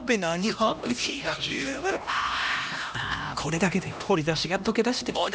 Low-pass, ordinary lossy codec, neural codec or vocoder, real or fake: none; none; codec, 16 kHz, 1 kbps, X-Codec, HuBERT features, trained on LibriSpeech; fake